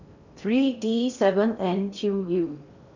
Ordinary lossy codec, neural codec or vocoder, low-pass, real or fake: none; codec, 16 kHz in and 24 kHz out, 0.8 kbps, FocalCodec, streaming, 65536 codes; 7.2 kHz; fake